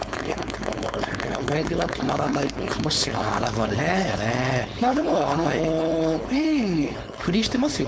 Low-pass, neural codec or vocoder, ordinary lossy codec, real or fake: none; codec, 16 kHz, 4.8 kbps, FACodec; none; fake